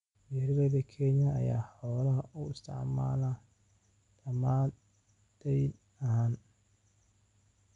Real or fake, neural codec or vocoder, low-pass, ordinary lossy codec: real; none; 10.8 kHz; none